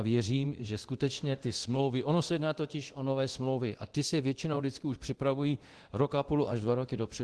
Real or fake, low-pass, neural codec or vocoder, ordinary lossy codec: fake; 10.8 kHz; codec, 24 kHz, 0.9 kbps, DualCodec; Opus, 16 kbps